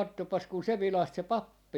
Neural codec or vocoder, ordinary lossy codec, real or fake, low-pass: none; none; real; 19.8 kHz